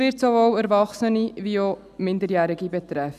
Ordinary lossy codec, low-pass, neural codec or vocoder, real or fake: none; 14.4 kHz; none; real